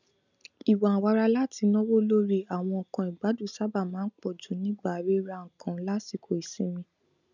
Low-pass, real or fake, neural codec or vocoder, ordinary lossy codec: 7.2 kHz; real; none; none